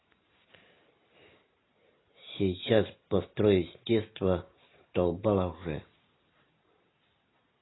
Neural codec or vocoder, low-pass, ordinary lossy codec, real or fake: none; 7.2 kHz; AAC, 16 kbps; real